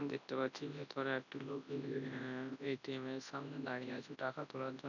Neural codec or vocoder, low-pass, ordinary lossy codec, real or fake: codec, 24 kHz, 0.9 kbps, WavTokenizer, large speech release; 7.2 kHz; none; fake